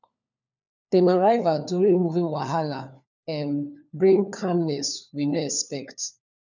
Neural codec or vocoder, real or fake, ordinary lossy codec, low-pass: codec, 16 kHz, 4 kbps, FunCodec, trained on LibriTTS, 50 frames a second; fake; none; 7.2 kHz